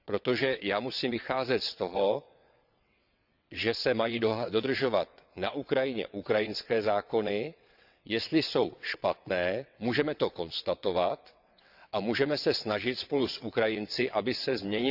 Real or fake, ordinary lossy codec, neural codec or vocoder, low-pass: fake; none; vocoder, 22.05 kHz, 80 mel bands, WaveNeXt; 5.4 kHz